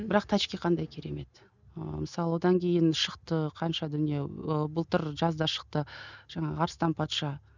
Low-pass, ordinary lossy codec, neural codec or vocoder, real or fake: 7.2 kHz; none; none; real